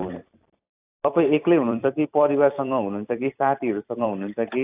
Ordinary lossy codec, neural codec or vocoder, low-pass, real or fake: AAC, 32 kbps; vocoder, 44.1 kHz, 128 mel bands every 256 samples, BigVGAN v2; 3.6 kHz; fake